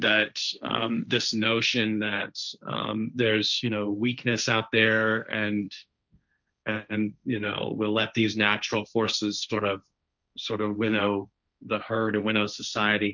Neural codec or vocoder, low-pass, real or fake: codec, 16 kHz, 1.1 kbps, Voila-Tokenizer; 7.2 kHz; fake